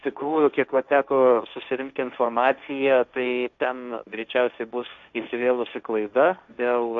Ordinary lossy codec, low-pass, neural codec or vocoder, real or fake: MP3, 96 kbps; 7.2 kHz; codec, 16 kHz, 1.1 kbps, Voila-Tokenizer; fake